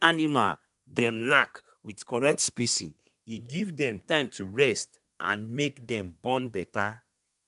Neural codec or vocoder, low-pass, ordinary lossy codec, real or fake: codec, 24 kHz, 1 kbps, SNAC; 10.8 kHz; none; fake